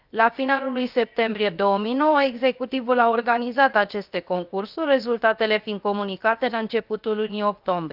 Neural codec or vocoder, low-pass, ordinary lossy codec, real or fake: codec, 16 kHz, 0.7 kbps, FocalCodec; 5.4 kHz; Opus, 24 kbps; fake